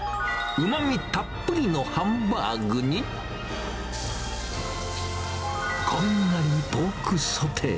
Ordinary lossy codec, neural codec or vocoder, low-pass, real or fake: none; none; none; real